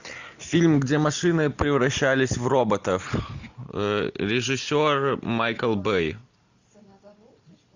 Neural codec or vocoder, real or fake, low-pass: none; real; 7.2 kHz